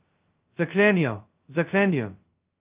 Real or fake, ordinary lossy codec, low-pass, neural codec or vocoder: fake; Opus, 32 kbps; 3.6 kHz; codec, 16 kHz, 0.2 kbps, FocalCodec